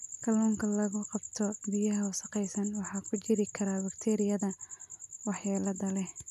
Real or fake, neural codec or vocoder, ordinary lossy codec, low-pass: real; none; none; 14.4 kHz